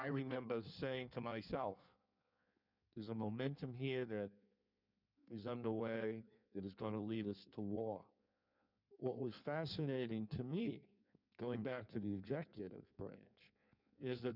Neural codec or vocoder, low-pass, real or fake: codec, 16 kHz in and 24 kHz out, 1.1 kbps, FireRedTTS-2 codec; 5.4 kHz; fake